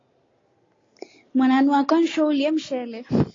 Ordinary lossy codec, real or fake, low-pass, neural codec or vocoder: AAC, 32 kbps; real; 7.2 kHz; none